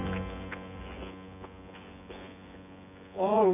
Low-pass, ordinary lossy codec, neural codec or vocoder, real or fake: 3.6 kHz; none; vocoder, 24 kHz, 100 mel bands, Vocos; fake